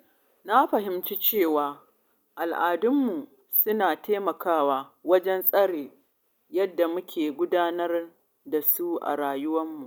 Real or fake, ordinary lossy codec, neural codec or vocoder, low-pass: real; none; none; none